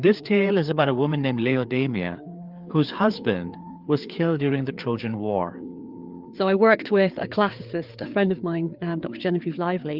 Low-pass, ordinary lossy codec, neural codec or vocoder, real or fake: 5.4 kHz; Opus, 32 kbps; codec, 16 kHz, 4 kbps, FreqCodec, larger model; fake